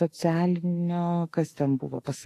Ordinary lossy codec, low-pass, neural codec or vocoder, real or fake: AAC, 48 kbps; 14.4 kHz; autoencoder, 48 kHz, 32 numbers a frame, DAC-VAE, trained on Japanese speech; fake